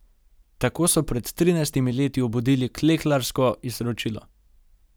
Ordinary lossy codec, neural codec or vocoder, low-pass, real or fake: none; none; none; real